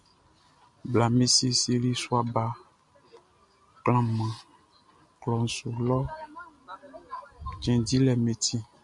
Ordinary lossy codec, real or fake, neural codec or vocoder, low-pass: MP3, 64 kbps; real; none; 10.8 kHz